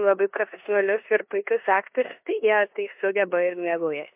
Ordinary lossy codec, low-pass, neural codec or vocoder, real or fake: MP3, 32 kbps; 3.6 kHz; codec, 16 kHz in and 24 kHz out, 0.9 kbps, LongCat-Audio-Codec, four codebook decoder; fake